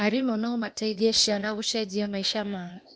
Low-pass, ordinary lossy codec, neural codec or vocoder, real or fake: none; none; codec, 16 kHz, 0.8 kbps, ZipCodec; fake